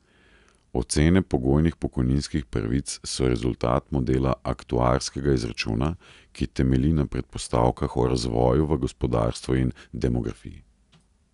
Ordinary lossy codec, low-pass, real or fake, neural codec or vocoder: none; 10.8 kHz; real; none